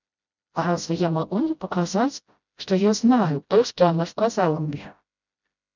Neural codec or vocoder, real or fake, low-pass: codec, 16 kHz, 0.5 kbps, FreqCodec, smaller model; fake; 7.2 kHz